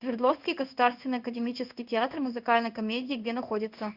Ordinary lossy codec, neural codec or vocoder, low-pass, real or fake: Opus, 64 kbps; codec, 16 kHz in and 24 kHz out, 1 kbps, XY-Tokenizer; 5.4 kHz; fake